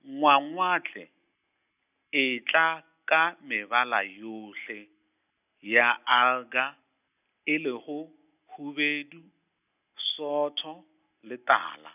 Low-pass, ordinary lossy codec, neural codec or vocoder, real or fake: 3.6 kHz; none; none; real